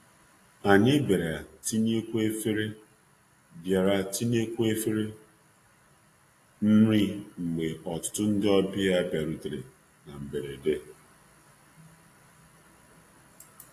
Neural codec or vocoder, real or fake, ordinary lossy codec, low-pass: none; real; AAC, 48 kbps; 14.4 kHz